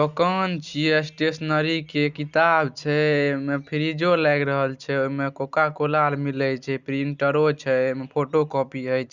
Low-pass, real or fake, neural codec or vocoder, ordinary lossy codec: 7.2 kHz; real; none; Opus, 64 kbps